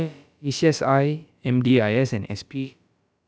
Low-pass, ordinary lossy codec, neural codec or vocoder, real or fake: none; none; codec, 16 kHz, about 1 kbps, DyCAST, with the encoder's durations; fake